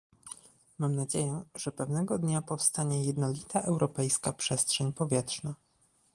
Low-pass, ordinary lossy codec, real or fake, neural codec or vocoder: 10.8 kHz; Opus, 24 kbps; real; none